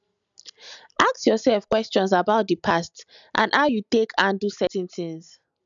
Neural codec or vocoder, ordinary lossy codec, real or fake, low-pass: none; none; real; 7.2 kHz